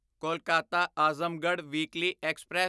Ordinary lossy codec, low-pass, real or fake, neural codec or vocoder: none; none; real; none